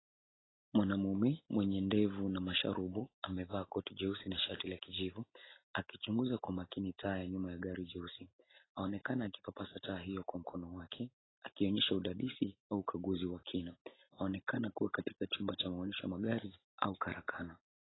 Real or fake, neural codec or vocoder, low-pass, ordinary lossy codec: real; none; 7.2 kHz; AAC, 16 kbps